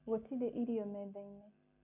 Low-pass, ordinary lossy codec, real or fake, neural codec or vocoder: 3.6 kHz; none; real; none